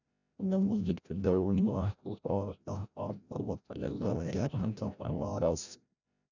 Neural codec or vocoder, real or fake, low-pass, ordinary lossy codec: codec, 16 kHz, 0.5 kbps, FreqCodec, larger model; fake; 7.2 kHz; none